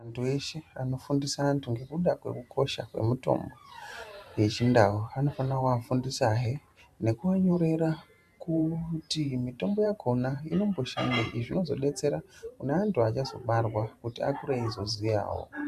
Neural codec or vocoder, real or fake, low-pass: vocoder, 44.1 kHz, 128 mel bands every 512 samples, BigVGAN v2; fake; 14.4 kHz